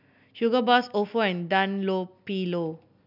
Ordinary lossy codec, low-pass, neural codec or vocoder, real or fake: none; 5.4 kHz; none; real